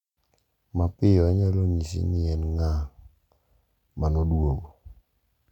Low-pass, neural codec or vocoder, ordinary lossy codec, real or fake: 19.8 kHz; none; none; real